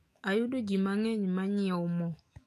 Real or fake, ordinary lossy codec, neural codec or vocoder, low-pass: fake; AAC, 64 kbps; autoencoder, 48 kHz, 128 numbers a frame, DAC-VAE, trained on Japanese speech; 14.4 kHz